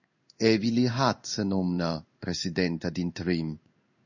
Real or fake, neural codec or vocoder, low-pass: fake; codec, 16 kHz in and 24 kHz out, 1 kbps, XY-Tokenizer; 7.2 kHz